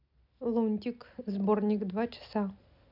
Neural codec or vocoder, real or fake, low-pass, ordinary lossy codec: none; real; 5.4 kHz; none